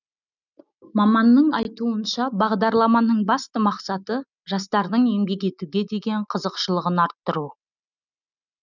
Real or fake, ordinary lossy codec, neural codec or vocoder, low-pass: real; none; none; 7.2 kHz